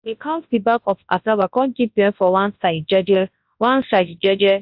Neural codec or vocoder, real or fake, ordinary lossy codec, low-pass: codec, 24 kHz, 0.9 kbps, WavTokenizer, large speech release; fake; AAC, 48 kbps; 5.4 kHz